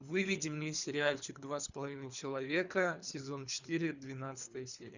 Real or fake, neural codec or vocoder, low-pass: fake; codec, 24 kHz, 3 kbps, HILCodec; 7.2 kHz